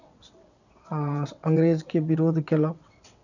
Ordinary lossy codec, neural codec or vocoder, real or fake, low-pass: none; vocoder, 22.05 kHz, 80 mel bands, WaveNeXt; fake; 7.2 kHz